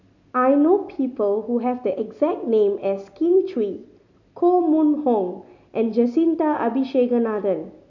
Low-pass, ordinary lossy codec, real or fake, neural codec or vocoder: 7.2 kHz; none; real; none